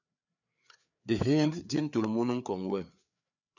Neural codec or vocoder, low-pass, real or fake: codec, 16 kHz, 4 kbps, FreqCodec, larger model; 7.2 kHz; fake